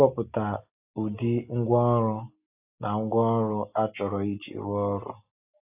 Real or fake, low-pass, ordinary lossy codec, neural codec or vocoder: real; 3.6 kHz; AAC, 32 kbps; none